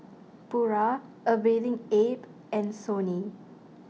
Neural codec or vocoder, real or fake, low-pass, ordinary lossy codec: none; real; none; none